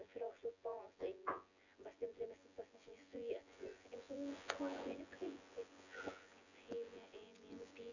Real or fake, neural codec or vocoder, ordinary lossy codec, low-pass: fake; vocoder, 24 kHz, 100 mel bands, Vocos; none; 7.2 kHz